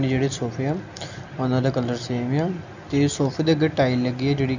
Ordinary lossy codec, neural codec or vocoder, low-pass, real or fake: none; none; 7.2 kHz; real